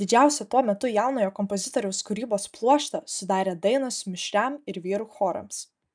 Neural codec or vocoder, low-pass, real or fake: none; 9.9 kHz; real